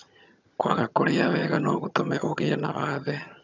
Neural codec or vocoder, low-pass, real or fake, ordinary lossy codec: vocoder, 22.05 kHz, 80 mel bands, HiFi-GAN; 7.2 kHz; fake; none